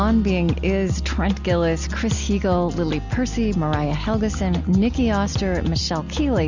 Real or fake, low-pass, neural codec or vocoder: real; 7.2 kHz; none